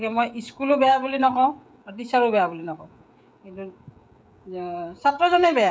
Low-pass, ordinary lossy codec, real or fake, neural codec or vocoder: none; none; fake; codec, 16 kHz, 16 kbps, FreqCodec, smaller model